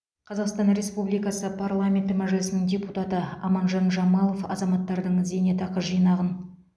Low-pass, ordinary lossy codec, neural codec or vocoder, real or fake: none; none; none; real